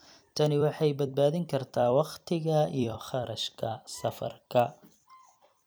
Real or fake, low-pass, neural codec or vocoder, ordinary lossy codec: fake; none; vocoder, 44.1 kHz, 128 mel bands every 256 samples, BigVGAN v2; none